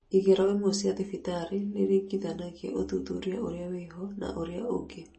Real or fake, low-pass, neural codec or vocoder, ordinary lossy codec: real; 9.9 kHz; none; MP3, 32 kbps